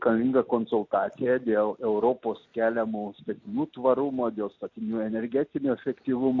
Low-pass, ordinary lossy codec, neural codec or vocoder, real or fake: 7.2 kHz; MP3, 48 kbps; none; real